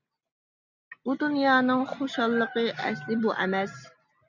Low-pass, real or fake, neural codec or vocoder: 7.2 kHz; real; none